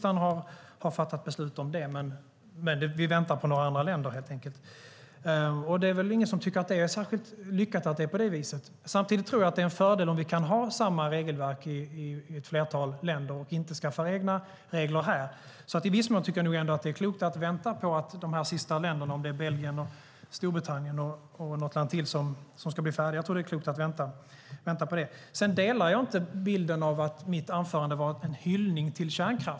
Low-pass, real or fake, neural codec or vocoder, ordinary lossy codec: none; real; none; none